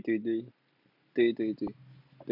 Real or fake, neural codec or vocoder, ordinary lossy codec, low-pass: real; none; none; 5.4 kHz